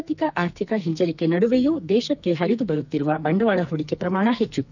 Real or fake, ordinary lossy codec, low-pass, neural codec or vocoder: fake; none; 7.2 kHz; codec, 44.1 kHz, 2.6 kbps, SNAC